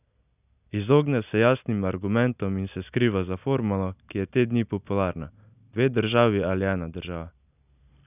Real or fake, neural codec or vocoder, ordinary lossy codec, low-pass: real; none; none; 3.6 kHz